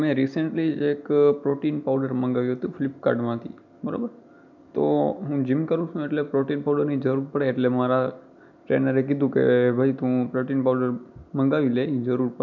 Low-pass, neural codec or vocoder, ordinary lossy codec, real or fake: 7.2 kHz; none; none; real